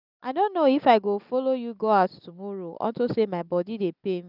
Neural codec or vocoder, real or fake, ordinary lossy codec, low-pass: none; real; none; 5.4 kHz